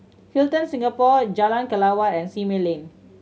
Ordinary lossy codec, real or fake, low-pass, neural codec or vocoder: none; real; none; none